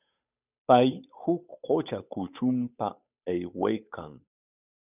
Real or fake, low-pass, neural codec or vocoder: fake; 3.6 kHz; codec, 16 kHz, 8 kbps, FunCodec, trained on Chinese and English, 25 frames a second